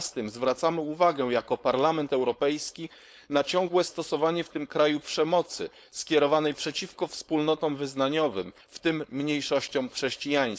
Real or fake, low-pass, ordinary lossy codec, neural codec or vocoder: fake; none; none; codec, 16 kHz, 4.8 kbps, FACodec